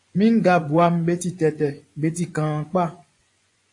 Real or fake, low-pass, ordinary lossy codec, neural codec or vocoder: fake; 10.8 kHz; AAC, 48 kbps; vocoder, 24 kHz, 100 mel bands, Vocos